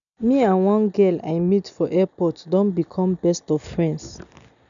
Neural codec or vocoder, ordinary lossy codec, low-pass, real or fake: none; none; 7.2 kHz; real